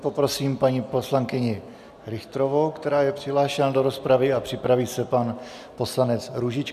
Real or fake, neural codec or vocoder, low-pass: real; none; 14.4 kHz